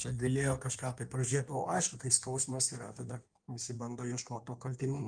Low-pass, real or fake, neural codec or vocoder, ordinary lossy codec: 9.9 kHz; fake; codec, 16 kHz in and 24 kHz out, 1.1 kbps, FireRedTTS-2 codec; Opus, 32 kbps